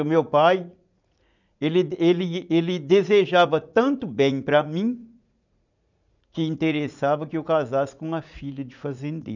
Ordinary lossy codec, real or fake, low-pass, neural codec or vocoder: none; fake; 7.2 kHz; vocoder, 44.1 kHz, 80 mel bands, Vocos